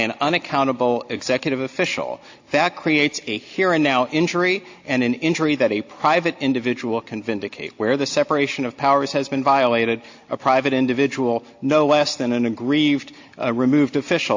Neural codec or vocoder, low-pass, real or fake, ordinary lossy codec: none; 7.2 kHz; real; AAC, 48 kbps